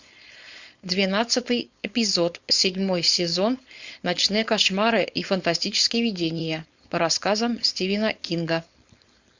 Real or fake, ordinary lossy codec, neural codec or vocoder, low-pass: fake; Opus, 64 kbps; codec, 16 kHz, 4.8 kbps, FACodec; 7.2 kHz